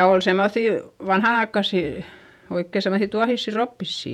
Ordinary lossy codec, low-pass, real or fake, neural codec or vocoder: none; 19.8 kHz; fake; vocoder, 48 kHz, 128 mel bands, Vocos